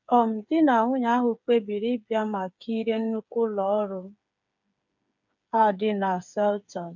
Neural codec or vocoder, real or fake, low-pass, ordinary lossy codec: codec, 16 kHz, 8 kbps, FreqCodec, smaller model; fake; 7.2 kHz; none